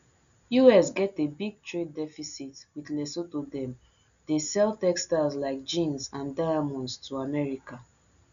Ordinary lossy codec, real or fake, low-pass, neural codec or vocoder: none; real; 7.2 kHz; none